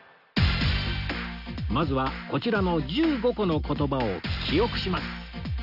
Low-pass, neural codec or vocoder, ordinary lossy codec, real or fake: 5.4 kHz; none; none; real